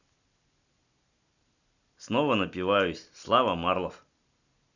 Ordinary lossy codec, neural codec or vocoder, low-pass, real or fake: none; none; 7.2 kHz; real